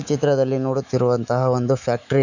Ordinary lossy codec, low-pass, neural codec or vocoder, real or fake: none; 7.2 kHz; autoencoder, 48 kHz, 128 numbers a frame, DAC-VAE, trained on Japanese speech; fake